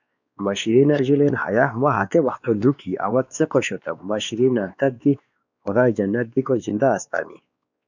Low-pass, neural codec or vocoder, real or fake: 7.2 kHz; codec, 16 kHz, 2 kbps, X-Codec, WavLM features, trained on Multilingual LibriSpeech; fake